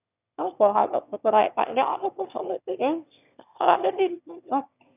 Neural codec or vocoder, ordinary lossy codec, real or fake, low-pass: autoencoder, 22.05 kHz, a latent of 192 numbers a frame, VITS, trained on one speaker; none; fake; 3.6 kHz